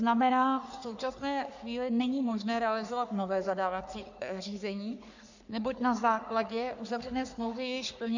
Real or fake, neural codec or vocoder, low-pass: fake; codec, 44.1 kHz, 3.4 kbps, Pupu-Codec; 7.2 kHz